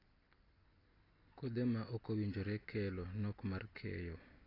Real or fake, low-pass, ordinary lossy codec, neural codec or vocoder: real; 5.4 kHz; AAC, 24 kbps; none